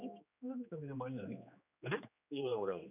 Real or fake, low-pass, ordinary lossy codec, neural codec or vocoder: fake; 3.6 kHz; none; codec, 16 kHz, 2 kbps, X-Codec, HuBERT features, trained on general audio